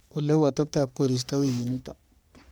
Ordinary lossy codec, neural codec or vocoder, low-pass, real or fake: none; codec, 44.1 kHz, 3.4 kbps, Pupu-Codec; none; fake